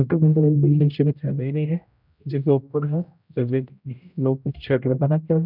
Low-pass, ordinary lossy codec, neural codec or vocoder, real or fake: 5.4 kHz; none; codec, 16 kHz, 0.5 kbps, X-Codec, HuBERT features, trained on general audio; fake